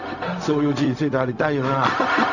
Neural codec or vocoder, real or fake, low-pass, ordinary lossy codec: codec, 16 kHz, 0.4 kbps, LongCat-Audio-Codec; fake; 7.2 kHz; none